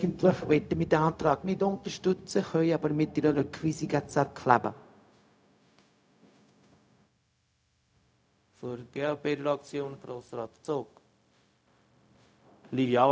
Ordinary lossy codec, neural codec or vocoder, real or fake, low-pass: none; codec, 16 kHz, 0.4 kbps, LongCat-Audio-Codec; fake; none